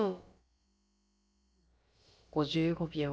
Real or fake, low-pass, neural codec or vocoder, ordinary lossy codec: fake; none; codec, 16 kHz, about 1 kbps, DyCAST, with the encoder's durations; none